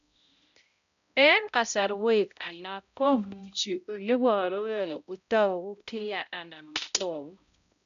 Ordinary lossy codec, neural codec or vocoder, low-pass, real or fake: none; codec, 16 kHz, 0.5 kbps, X-Codec, HuBERT features, trained on balanced general audio; 7.2 kHz; fake